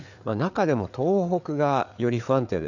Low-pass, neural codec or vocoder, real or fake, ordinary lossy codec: 7.2 kHz; codec, 16 kHz, 4 kbps, FreqCodec, larger model; fake; none